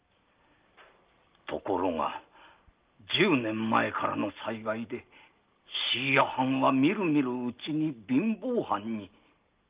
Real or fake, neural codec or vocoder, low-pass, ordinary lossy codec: real; none; 3.6 kHz; Opus, 32 kbps